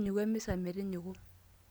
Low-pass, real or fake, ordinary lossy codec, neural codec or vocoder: none; real; none; none